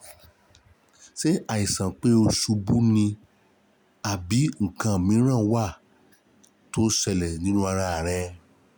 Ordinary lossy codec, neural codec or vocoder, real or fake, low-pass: none; none; real; none